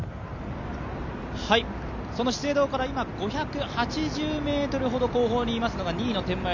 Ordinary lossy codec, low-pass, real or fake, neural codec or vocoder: none; 7.2 kHz; real; none